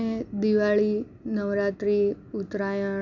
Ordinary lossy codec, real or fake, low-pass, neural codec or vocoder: none; real; 7.2 kHz; none